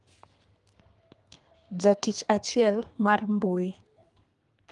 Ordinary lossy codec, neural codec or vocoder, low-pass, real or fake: Opus, 32 kbps; codec, 32 kHz, 1.9 kbps, SNAC; 10.8 kHz; fake